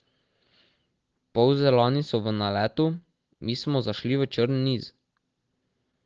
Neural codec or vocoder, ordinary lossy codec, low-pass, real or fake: none; Opus, 16 kbps; 7.2 kHz; real